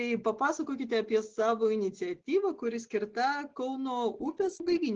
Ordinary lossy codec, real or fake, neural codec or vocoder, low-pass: Opus, 16 kbps; real; none; 7.2 kHz